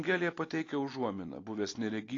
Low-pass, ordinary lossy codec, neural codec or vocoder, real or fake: 7.2 kHz; AAC, 32 kbps; none; real